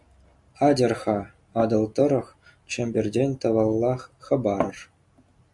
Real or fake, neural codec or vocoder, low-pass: real; none; 10.8 kHz